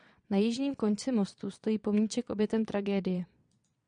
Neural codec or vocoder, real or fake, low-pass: vocoder, 22.05 kHz, 80 mel bands, Vocos; fake; 9.9 kHz